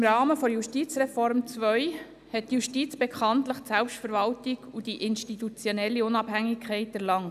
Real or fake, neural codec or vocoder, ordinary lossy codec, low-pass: real; none; none; 14.4 kHz